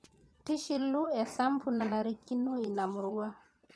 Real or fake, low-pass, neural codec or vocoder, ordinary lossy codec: fake; none; vocoder, 22.05 kHz, 80 mel bands, Vocos; none